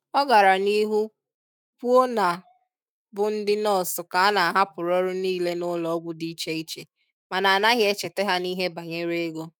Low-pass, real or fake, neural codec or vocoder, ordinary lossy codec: none; fake; autoencoder, 48 kHz, 128 numbers a frame, DAC-VAE, trained on Japanese speech; none